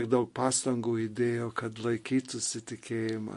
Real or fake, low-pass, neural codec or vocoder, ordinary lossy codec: real; 14.4 kHz; none; MP3, 48 kbps